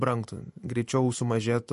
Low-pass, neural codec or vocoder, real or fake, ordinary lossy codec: 14.4 kHz; vocoder, 44.1 kHz, 128 mel bands every 512 samples, BigVGAN v2; fake; MP3, 48 kbps